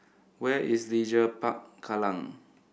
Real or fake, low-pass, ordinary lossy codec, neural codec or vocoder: real; none; none; none